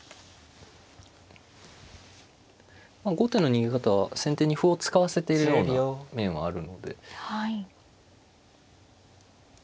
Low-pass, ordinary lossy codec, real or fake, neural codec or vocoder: none; none; real; none